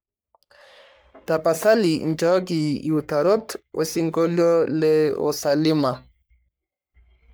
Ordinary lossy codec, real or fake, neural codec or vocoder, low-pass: none; fake; codec, 44.1 kHz, 3.4 kbps, Pupu-Codec; none